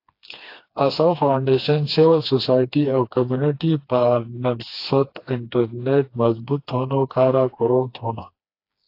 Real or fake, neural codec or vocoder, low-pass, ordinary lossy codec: fake; codec, 16 kHz, 2 kbps, FreqCodec, smaller model; 5.4 kHz; AAC, 32 kbps